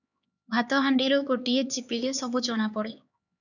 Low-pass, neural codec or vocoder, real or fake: 7.2 kHz; codec, 16 kHz, 4 kbps, X-Codec, HuBERT features, trained on LibriSpeech; fake